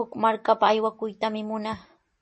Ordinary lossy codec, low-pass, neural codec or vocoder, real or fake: MP3, 32 kbps; 10.8 kHz; none; real